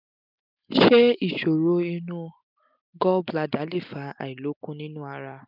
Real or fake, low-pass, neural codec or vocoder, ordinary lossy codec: real; 5.4 kHz; none; none